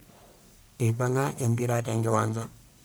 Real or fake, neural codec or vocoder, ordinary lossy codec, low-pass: fake; codec, 44.1 kHz, 1.7 kbps, Pupu-Codec; none; none